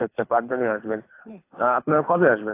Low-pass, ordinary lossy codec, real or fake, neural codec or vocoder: 3.6 kHz; AAC, 24 kbps; fake; codec, 24 kHz, 3 kbps, HILCodec